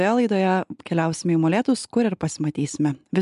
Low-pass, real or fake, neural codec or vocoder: 10.8 kHz; real; none